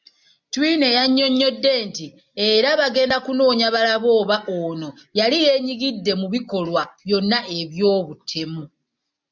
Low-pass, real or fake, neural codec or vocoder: 7.2 kHz; real; none